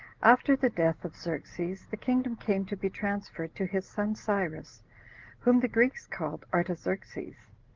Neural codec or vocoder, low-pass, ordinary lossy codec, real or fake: none; 7.2 kHz; Opus, 16 kbps; real